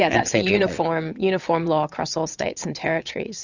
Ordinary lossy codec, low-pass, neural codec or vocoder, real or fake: Opus, 64 kbps; 7.2 kHz; vocoder, 22.05 kHz, 80 mel bands, WaveNeXt; fake